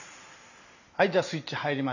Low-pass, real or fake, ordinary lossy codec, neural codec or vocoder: 7.2 kHz; real; none; none